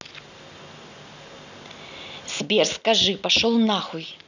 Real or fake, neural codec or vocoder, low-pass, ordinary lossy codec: real; none; 7.2 kHz; none